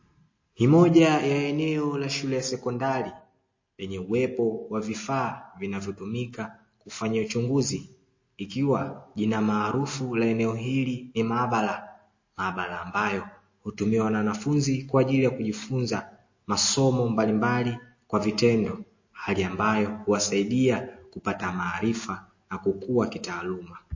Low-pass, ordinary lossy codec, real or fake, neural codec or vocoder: 7.2 kHz; MP3, 32 kbps; real; none